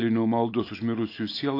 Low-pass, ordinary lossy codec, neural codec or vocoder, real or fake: 5.4 kHz; AAC, 32 kbps; none; real